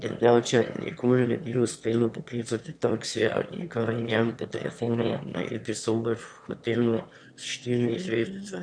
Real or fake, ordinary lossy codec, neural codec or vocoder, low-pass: fake; none; autoencoder, 22.05 kHz, a latent of 192 numbers a frame, VITS, trained on one speaker; 9.9 kHz